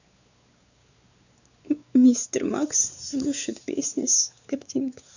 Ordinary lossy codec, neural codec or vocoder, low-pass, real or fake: none; codec, 16 kHz, 4 kbps, X-Codec, WavLM features, trained on Multilingual LibriSpeech; 7.2 kHz; fake